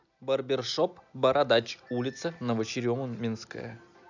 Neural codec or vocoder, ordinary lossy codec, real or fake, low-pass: none; none; real; 7.2 kHz